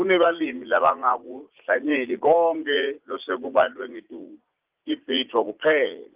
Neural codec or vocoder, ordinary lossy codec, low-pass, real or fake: vocoder, 44.1 kHz, 80 mel bands, Vocos; Opus, 32 kbps; 3.6 kHz; fake